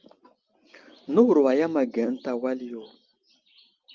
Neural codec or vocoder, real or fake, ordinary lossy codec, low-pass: none; real; Opus, 24 kbps; 7.2 kHz